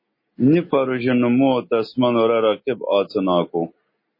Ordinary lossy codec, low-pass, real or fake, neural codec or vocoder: MP3, 24 kbps; 5.4 kHz; real; none